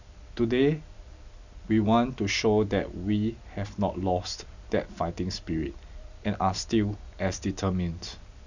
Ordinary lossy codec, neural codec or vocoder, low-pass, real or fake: none; none; 7.2 kHz; real